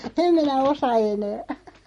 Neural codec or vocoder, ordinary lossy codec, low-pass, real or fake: none; MP3, 48 kbps; 19.8 kHz; real